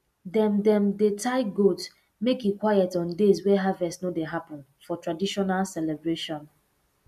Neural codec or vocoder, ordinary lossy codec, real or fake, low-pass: none; MP3, 96 kbps; real; 14.4 kHz